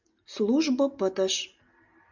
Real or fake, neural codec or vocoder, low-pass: real; none; 7.2 kHz